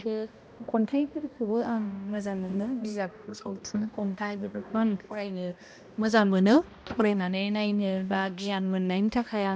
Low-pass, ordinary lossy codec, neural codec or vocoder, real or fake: none; none; codec, 16 kHz, 1 kbps, X-Codec, HuBERT features, trained on balanced general audio; fake